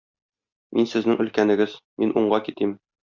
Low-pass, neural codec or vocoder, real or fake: 7.2 kHz; none; real